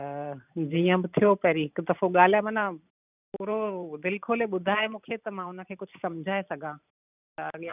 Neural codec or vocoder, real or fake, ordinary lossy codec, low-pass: vocoder, 44.1 kHz, 128 mel bands every 512 samples, BigVGAN v2; fake; none; 3.6 kHz